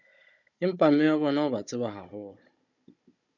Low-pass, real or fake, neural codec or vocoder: 7.2 kHz; fake; codec, 16 kHz, 16 kbps, FunCodec, trained on Chinese and English, 50 frames a second